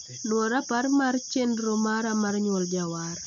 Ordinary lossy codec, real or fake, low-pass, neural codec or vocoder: none; real; 7.2 kHz; none